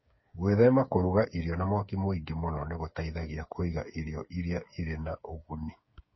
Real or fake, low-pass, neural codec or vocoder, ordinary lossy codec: fake; 7.2 kHz; codec, 16 kHz, 8 kbps, FreqCodec, smaller model; MP3, 24 kbps